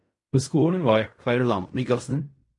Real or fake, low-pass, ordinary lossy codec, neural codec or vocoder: fake; 10.8 kHz; MP3, 48 kbps; codec, 16 kHz in and 24 kHz out, 0.4 kbps, LongCat-Audio-Codec, fine tuned four codebook decoder